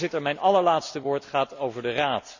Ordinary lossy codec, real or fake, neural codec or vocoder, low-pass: none; real; none; 7.2 kHz